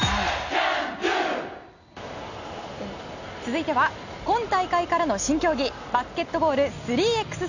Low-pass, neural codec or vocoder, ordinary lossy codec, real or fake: 7.2 kHz; none; none; real